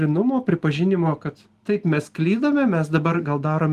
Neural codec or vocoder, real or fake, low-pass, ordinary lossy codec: none; real; 14.4 kHz; Opus, 24 kbps